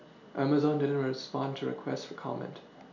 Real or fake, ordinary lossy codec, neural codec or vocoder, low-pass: real; none; none; 7.2 kHz